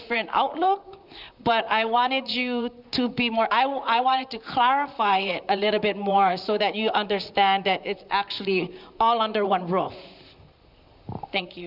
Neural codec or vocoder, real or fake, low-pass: vocoder, 44.1 kHz, 128 mel bands, Pupu-Vocoder; fake; 5.4 kHz